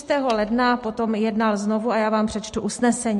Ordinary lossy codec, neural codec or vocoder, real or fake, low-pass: MP3, 48 kbps; none; real; 14.4 kHz